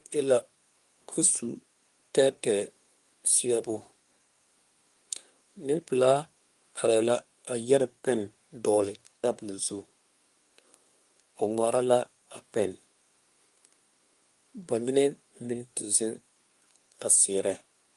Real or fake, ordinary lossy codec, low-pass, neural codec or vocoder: fake; Opus, 32 kbps; 10.8 kHz; codec, 24 kHz, 1 kbps, SNAC